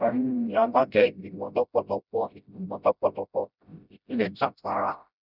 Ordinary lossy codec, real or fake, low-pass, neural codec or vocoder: none; fake; 5.4 kHz; codec, 16 kHz, 0.5 kbps, FreqCodec, smaller model